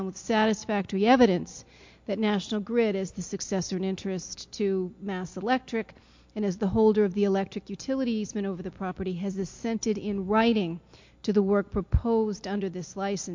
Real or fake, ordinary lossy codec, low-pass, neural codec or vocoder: real; MP3, 48 kbps; 7.2 kHz; none